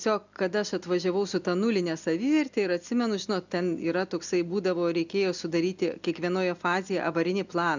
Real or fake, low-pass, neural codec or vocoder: real; 7.2 kHz; none